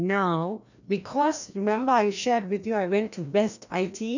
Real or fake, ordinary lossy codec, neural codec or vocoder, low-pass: fake; none; codec, 16 kHz, 1 kbps, FreqCodec, larger model; 7.2 kHz